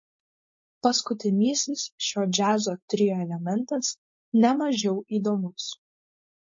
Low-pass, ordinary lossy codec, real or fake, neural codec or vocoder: 7.2 kHz; MP3, 32 kbps; fake; codec, 16 kHz, 4.8 kbps, FACodec